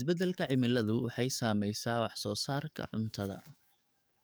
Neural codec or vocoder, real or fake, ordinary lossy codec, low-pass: codec, 44.1 kHz, 7.8 kbps, DAC; fake; none; none